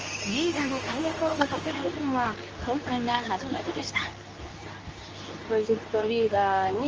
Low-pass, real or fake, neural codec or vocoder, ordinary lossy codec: 7.2 kHz; fake; codec, 24 kHz, 0.9 kbps, WavTokenizer, medium speech release version 2; Opus, 24 kbps